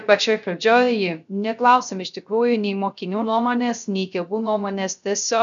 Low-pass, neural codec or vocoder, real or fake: 7.2 kHz; codec, 16 kHz, 0.3 kbps, FocalCodec; fake